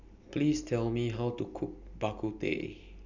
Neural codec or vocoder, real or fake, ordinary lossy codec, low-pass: none; real; none; 7.2 kHz